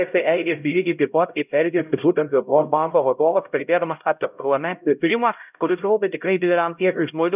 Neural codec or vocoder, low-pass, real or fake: codec, 16 kHz, 0.5 kbps, X-Codec, HuBERT features, trained on LibriSpeech; 3.6 kHz; fake